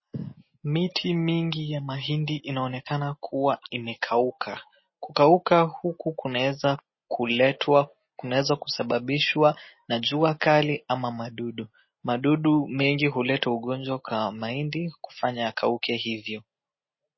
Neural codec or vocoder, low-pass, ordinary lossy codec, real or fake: none; 7.2 kHz; MP3, 24 kbps; real